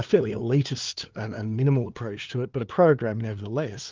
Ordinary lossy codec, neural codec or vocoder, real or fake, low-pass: Opus, 32 kbps; codec, 16 kHz, 2 kbps, FunCodec, trained on LibriTTS, 25 frames a second; fake; 7.2 kHz